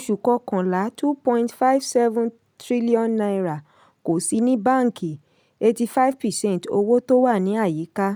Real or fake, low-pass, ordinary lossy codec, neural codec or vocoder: real; none; none; none